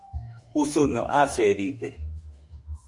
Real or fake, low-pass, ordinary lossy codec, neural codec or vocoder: fake; 10.8 kHz; MP3, 48 kbps; autoencoder, 48 kHz, 32 numbers a frame, DAC-VAE, trained on Japanese speech